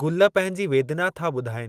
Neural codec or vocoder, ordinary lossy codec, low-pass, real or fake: none; Opus, 24 kbps; 14.4 kHz; real